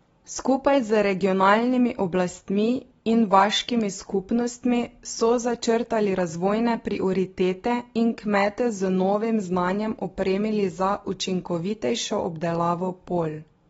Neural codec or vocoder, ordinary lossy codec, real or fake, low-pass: none; AAC, 24 kbps; real; 19.8 kHz